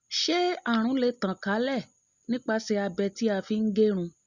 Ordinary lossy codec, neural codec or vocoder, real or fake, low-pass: Opus, 64 kbps; none; real; 7.2 kHz